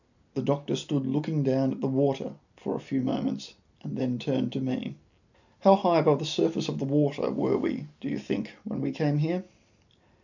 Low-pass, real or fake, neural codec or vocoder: 7.2 kHz; real; none